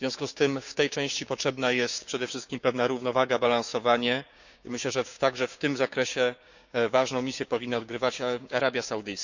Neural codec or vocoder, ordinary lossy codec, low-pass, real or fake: codec, 16 kHz, 6 kbps, DAC; none; 7.2 kHz; fake